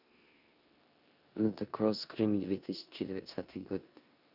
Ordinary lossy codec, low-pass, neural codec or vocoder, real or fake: none; 5.4 kHz; codec, 16 kHz in and 24 kHz out, 0.9 kbps, LongCat-Audio-Codec, four codebook decoder; fake